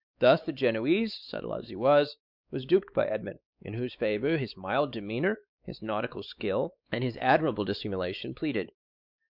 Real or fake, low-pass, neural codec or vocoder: fake; 5.4 kHz; codec, 16 kHz, 4 kbps, X-Codec, WavLM features, trained on Multilingual LibriSpeech